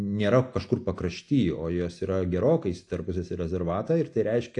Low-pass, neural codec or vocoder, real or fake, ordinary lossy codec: 10.8 kHz; none; real; AAC, 48 kbps